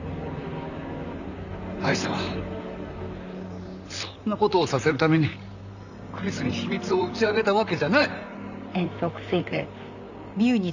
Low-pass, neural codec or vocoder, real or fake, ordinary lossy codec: 7.2 kHz; vocoder, 44.1 kHz, 128 mel bands, Pupu-Vocoder; fake; none